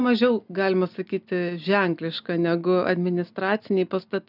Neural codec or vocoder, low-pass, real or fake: none; 5.4 kHz; real